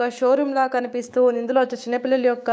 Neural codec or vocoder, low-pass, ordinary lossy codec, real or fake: codec, 16 kHz, 6 kbps, DAC; none; none; fake